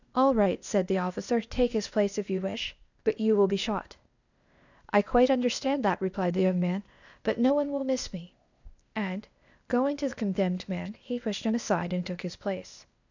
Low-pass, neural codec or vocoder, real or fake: 7.2 kHz; codec, 16 kHz, 0.8 kbps, ZipCodec; fake